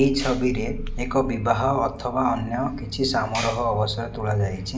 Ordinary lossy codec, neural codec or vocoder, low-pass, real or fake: none; none; none; real